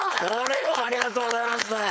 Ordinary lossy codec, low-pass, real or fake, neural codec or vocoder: none; none; fake; codec, 16 kHz, 4.8 kbps, FACodec